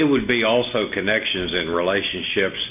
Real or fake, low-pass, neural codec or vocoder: real; 3.6 kHz; none